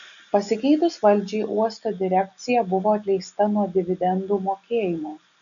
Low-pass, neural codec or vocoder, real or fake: 7.2 kHz; none; real